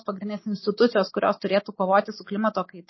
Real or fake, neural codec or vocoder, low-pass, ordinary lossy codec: real; none; 7.2 kHz; MP3, 24 kbps